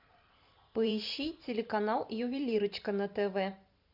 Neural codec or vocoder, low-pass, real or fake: vocoder, 44.1 kHz, 128 mel bands every 512 samples, BigVGAN v2; 5.4 kHz; fake